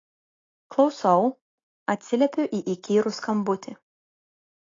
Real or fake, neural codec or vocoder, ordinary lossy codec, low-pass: real; none; AAC, 32 kbps; 7.2 kHz